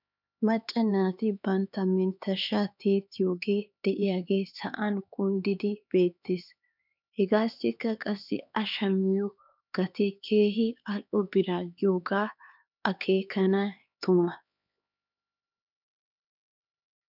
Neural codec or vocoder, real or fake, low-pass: codec, 16 kHz, 4 kbps, X-Codec, HuBERT features, trained on LibriSpeech; fake; 5.4 kHz